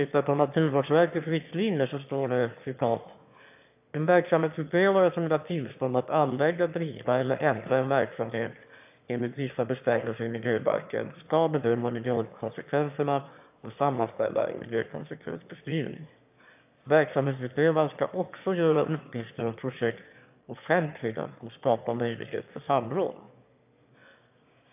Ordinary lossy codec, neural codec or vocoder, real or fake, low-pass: AAC, 32 kbps; autoencoder, 22.05 kHz, a latent of 192 numbers a frame, VITS, trained on one speaker; fake; 3.6 kHz